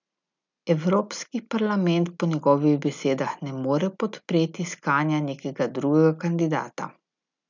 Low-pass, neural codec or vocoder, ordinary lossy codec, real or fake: 7.2 kHz; none; none; real